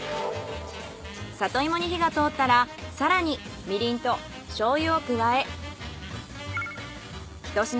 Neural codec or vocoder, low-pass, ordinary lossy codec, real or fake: none; none; none; real